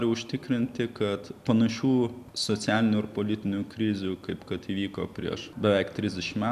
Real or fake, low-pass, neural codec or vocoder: real; 14.4 kHz; none